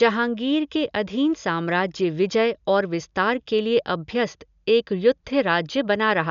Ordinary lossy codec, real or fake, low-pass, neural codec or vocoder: none; real; 7.2 kHz; none